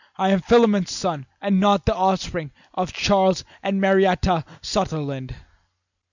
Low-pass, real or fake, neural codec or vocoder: 7.2 kHz; real; none